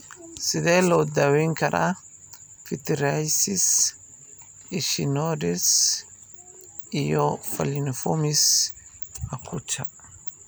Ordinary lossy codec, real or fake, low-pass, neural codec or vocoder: none; real; none; none